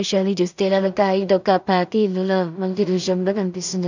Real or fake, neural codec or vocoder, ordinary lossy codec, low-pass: fake; codec, 16 kHz in and 24 kHz out, 0.4 kbps, LongCat-Audio-Codec, two codebook decoder; none; 7.2 kHz